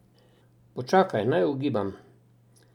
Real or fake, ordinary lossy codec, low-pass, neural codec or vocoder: real; none; 19.8 kHz; none